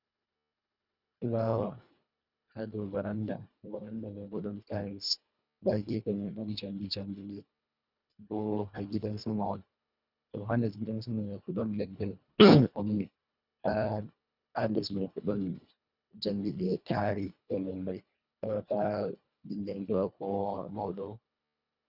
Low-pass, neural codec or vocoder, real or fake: 5.4 kHz; codec, 24 kHz, 1.5 kbps, HILCodec; fake